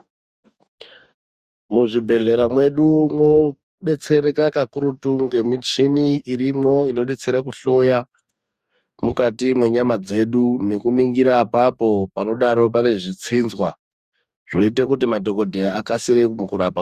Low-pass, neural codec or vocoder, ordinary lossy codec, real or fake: 14.4 kHz; codec, 44.1 kHz, 2.6 kbps, DAC; Opus, 64 kbps; fake